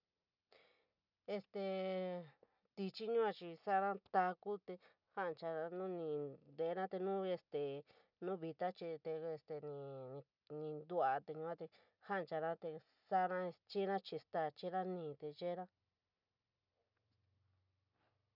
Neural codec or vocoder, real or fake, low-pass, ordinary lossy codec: none; real; 5.4 kHz; none